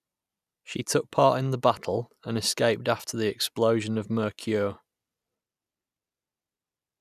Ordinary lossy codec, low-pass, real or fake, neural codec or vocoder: none; 14.4 kHz; real; none